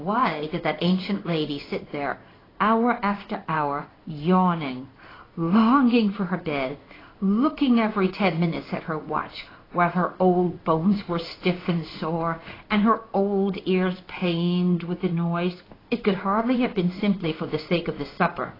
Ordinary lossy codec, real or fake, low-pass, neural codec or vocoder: AAC, 24 kbps; fake; 5.4 kHz; codec, 16 kHz in and 24 kHz out, 1 kbps, XY-Tokenizer